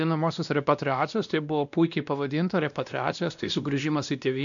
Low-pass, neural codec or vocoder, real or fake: 7.2 kHz; codec, 16 kHz, 1 kbps, X-Codec, WavLM features, trained on Multilingual LibriSpeech; fake